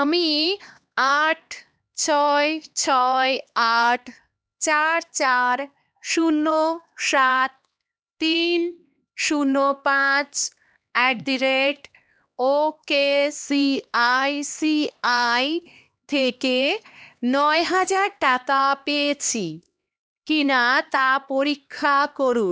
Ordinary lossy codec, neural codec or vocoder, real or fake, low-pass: none; codec, 16 kHz, 2 kbps, X-Codec, HuBERT features, trained on LibriSpeech; fake; none